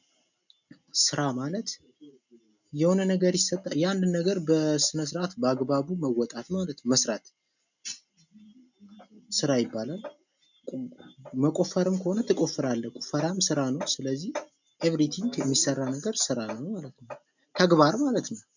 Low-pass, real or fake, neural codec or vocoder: 7.2 kHz; real; none